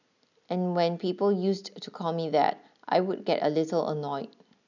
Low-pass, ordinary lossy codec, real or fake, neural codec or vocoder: 7.2 kHz; none; real; none